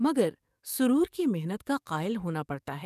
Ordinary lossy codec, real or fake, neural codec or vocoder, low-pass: none; fake; codec, 44.1 kHz, 7.8 kbps, DAC; 14.4 kHz